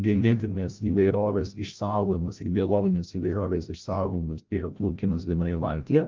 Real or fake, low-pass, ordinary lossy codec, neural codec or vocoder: fake; 7.2 kHz; Opus, 32 kbps; codec, 16 kHz, 0.5 kbps, FreqCodec, larger model